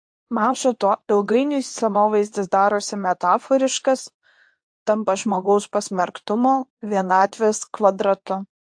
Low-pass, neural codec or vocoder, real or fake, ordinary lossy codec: 9.9 kHz; codec, 24 kHz, 0.9 kbps, WavTokenizer, medium speech release version 2; fake; AAC, 64 kbps